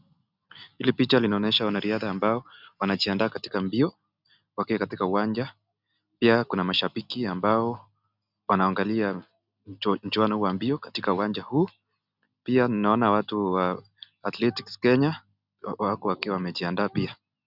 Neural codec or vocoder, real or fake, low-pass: none; real; 5.4 kHz